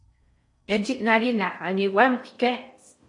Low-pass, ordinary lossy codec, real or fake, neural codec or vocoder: 10.8 kHz; MP3, 48 kbps; fake; codec, 16 kHz in and 24 kHz out, 0.6 kbps, FocalCodec, streaming, 4096 codes